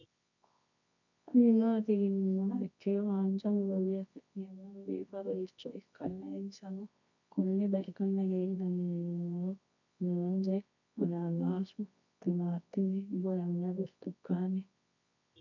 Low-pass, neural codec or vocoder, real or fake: 7.2 kHz; codec, 24 kHz, 0.9 kbps, WavTokenizer, medium music audio release; fake